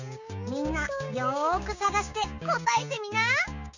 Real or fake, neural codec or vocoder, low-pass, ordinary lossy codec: fake; codec, 16 kHz, 6 kbps, DAC; 7.2 kHz; none